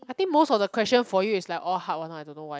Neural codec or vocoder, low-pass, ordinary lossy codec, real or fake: none; none; none; real